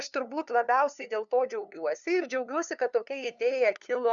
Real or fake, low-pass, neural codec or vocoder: fake; 7.2 kHz; codec, 16 kHz, 2 kbps, FunCodec, trained on LibriTTS, 25 frames a second